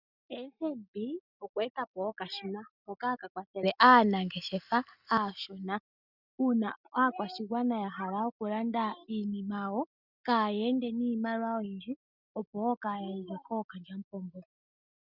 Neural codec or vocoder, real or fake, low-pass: none; real; 5.4 kHz